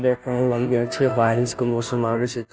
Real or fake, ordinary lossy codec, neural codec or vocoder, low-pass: fake; none; codec, 16 kHz, 0.5 kbps, FunCodec, trained on Chinese and English, 25 frames a second; none